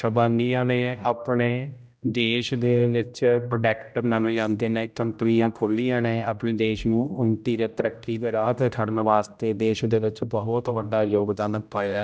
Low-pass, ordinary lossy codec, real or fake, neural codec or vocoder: none; none; fake; codec, 16 kHz, 0.5 kbps, X-Codec, HuBERT features, trained on general audio